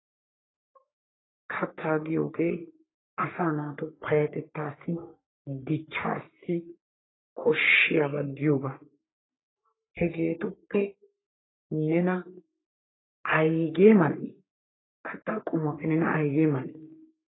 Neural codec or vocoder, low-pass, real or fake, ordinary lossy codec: codec, 44.1 kHz, 3.4 kbps, Pupu-Codec; 7.2 kHz; fake; AAC, 16 kbps